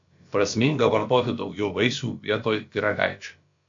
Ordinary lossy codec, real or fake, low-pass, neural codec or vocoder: MP3, 48 kbps; fake; 7.2 kHz; codec, 16 kHz, about 1 kbps, DyCAST, with the encoder's durations